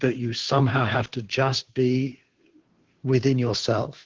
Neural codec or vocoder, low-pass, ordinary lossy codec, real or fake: codec, 16 kHz, 2 kbps, FunCodec, trained on Chinese and English, 25 frames a second; 7.2 kHz; Opus, 16 kbps; fake